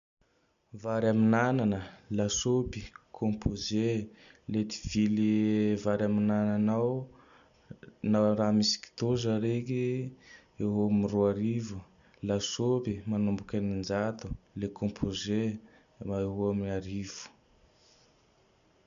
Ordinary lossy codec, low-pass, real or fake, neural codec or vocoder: none; 7.2 kHz; real; none